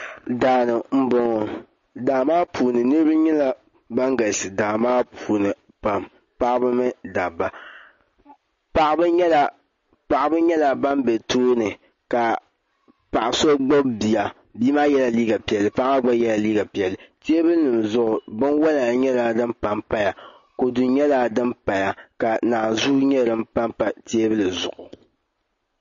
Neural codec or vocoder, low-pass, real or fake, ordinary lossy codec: none; 7.2 kHz; real; MP3, 32 kbps